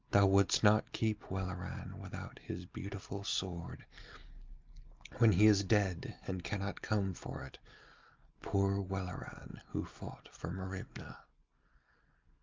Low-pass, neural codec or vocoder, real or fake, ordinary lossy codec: 7.2 kHz; none; real; Opus, 24 kbps